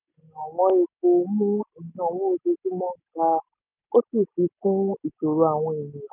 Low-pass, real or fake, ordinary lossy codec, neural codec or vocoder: 3.6 kHz; real; none; none